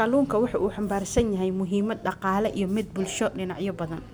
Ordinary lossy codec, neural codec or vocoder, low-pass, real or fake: none; none; none; real